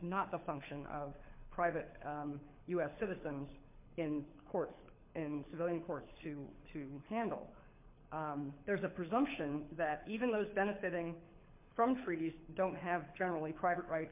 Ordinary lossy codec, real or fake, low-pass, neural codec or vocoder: MP3, 32 kbps; fake; 3.6 kHz; codec, 24 kHz, 6 kbps, HILCodec